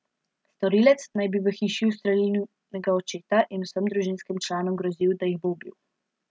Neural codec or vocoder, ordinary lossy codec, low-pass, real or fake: none; none; none; real